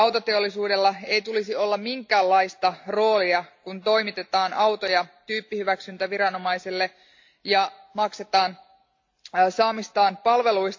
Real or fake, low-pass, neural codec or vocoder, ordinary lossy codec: real; 7.2 kHz; none; AAC, 48 kbps